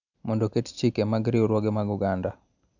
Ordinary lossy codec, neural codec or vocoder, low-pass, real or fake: none; none; 7.2 kHz; real